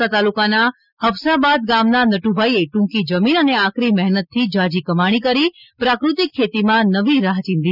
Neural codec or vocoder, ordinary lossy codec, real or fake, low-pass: none; none; real; 5.4 kHz